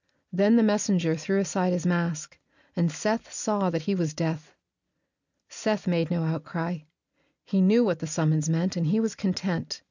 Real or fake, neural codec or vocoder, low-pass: real; none; 7.2 kHz